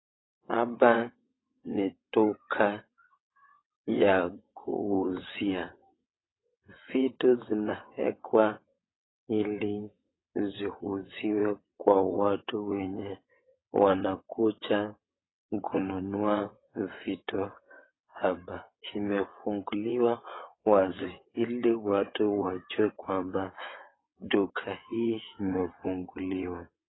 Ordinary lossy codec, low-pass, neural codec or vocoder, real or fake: AAC, 16 kbps; 7.2 kHz; vocoder, 22.05 kHz, 80 mel bands, WaveNeXt; fake